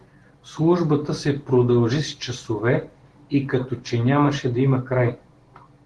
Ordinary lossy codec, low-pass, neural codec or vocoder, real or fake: Opus, 16 kbps; 10.8 kHz; none; real